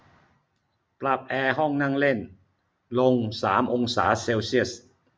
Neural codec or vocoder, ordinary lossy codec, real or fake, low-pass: none; none; real; none